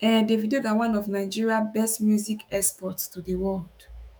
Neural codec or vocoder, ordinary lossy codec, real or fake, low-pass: autoencoder, 48 kHz, 128 numbers a frame, DAC-VAE, trained on Japanese speech; none; fake; 19.8 kHz